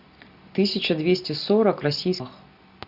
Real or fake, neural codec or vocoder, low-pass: real; none; 5.4 kHz